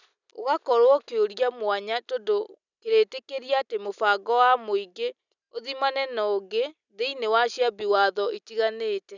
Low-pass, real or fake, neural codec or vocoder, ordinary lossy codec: 7.2 kHz; real; none; none